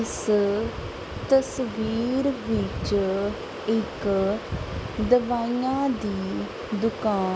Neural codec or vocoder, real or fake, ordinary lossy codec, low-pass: none; real; none; none